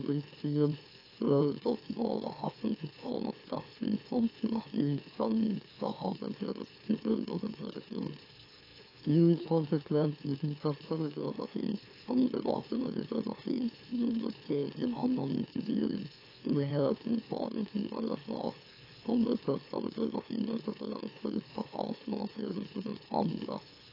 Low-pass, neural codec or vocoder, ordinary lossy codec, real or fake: 5.4 kHz; autoencoder, 44.1 kHz, a latent of 192 numbers a frame, MeloTTS; AAC, 32 kbps; fake